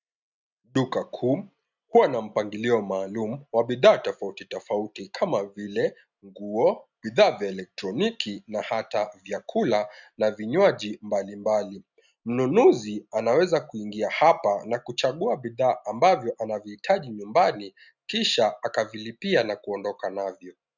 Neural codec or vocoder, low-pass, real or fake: none; 7.2 kHz; real